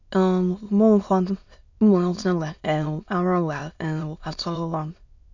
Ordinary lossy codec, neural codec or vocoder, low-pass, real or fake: none; autoencoder, 22.05 kHz, a latent of 192 numbers a frame, VITS, trained on many speakers; 7.2 kHz; fake